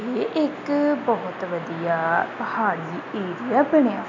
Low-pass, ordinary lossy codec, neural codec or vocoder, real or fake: 7.2 kHz; none; none; real